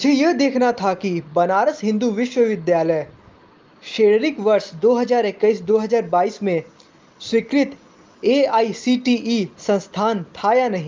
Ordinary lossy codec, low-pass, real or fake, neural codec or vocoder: Opus, 24 kbps; 7.2 kHz; real; none